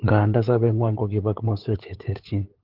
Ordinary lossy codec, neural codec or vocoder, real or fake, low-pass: Opus, 16 kbps; codec, 16 kHz, 8 kbps, FunCodec, trained on LibriTTS, 25 frames a second; fake; 5.4 kHz